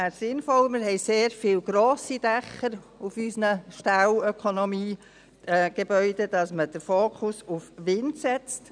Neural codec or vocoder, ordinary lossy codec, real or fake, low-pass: none; none; real; 9.9 kHz